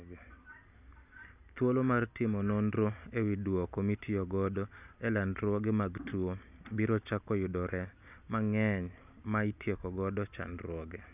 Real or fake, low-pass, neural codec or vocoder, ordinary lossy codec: real; 3.6 kHz; none; none